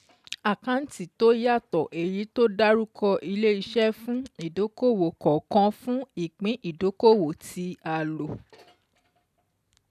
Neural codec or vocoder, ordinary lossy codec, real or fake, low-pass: none; none; real; 14.4 kHz